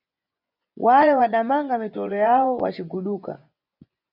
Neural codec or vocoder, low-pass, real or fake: vocoder, 24 kHz, 100 mel bands, Vocos; 5.4 kHz; fake